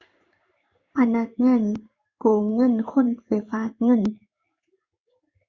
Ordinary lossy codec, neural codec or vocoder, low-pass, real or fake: Opus, 64 kbps; codec, 16 kHz in and 24 kHz out, 1 kbps, XY-Tokenizer; 7.2 kHz; fake